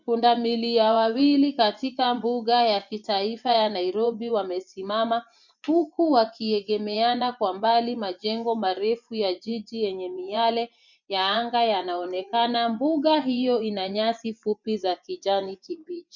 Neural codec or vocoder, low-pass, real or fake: vocoder, 24 kHz, 100 mel bands, Vocos; 7.2 kHz; fake